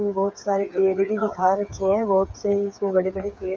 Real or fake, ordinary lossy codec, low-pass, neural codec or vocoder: fake; none; none; codec, 16 kHz, 8 kbps, FreqCodec, smaller model